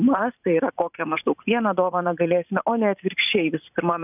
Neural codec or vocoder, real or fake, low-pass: vocoder, 44.1 kHz, 128 mel bands every 512 samples, BigVGAN v2; fake; 3.6 kHz